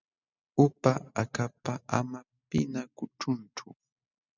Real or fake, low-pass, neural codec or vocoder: real; 7.2 kHz; none